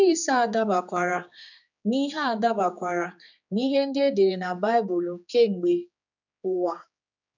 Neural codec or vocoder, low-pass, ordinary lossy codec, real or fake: codec, 16 kHz, 4 kbps, X-Codec, HuBERT features, trained on general audio; 7.2 kHz; none; fake